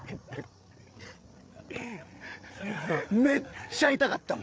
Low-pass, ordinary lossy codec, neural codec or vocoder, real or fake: none; none; codec, 16 kHz, 8 kbps, FreqCodec, larger model; fake